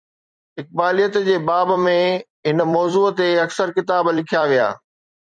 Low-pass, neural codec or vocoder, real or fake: 9.9 kHz; vocoder, 44.1 kHz, 128 mel bands every 256 samples, BigVGAN v2; fake